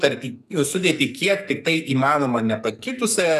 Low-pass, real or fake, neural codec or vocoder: 14.4 kHz; fake; codec, 44.1 kHz, 2.6 kbps, SNAC